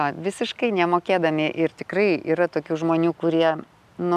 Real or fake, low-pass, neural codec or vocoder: real; 14.4 kHz; none